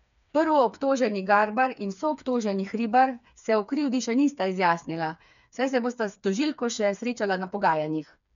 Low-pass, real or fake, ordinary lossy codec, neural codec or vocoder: 7.2 kHz; fake; none; codec, 16 kHz, 4 kbps, FreqCodec, smaller model